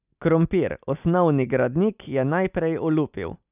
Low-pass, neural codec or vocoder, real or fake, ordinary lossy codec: 3.6 kHz; none; real; none